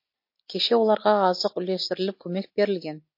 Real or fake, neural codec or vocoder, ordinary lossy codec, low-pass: real; none; MP3, 32 kbps; 5.4 kHz